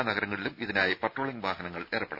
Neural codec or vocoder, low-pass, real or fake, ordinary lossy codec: none; 5.4 kHz; real; none